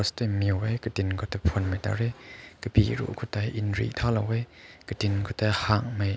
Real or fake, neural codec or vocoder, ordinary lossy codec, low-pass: real; none; none; none